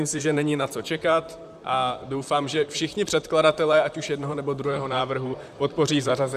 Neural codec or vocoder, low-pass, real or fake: vocoder, 44.1 kHz, 128 mel bands, Pupu-Vocoder; 14.4 kHz; fake